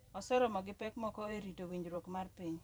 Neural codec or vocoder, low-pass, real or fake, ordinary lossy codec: vocoder, 44.1 kHz, 128 mel bands every 512 samples, BigVGAN v2; none; fake; none